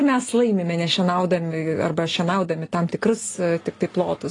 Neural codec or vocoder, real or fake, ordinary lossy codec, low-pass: none; real; AAC, 32 kbps; 10.8 kHz